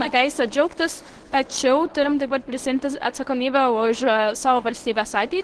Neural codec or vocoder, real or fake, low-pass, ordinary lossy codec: codec, 24 kHz, 0.9 kbps, WavTokenizer, medium speech release version 1; fake; 10.8 kHz; Opus, 16 kbps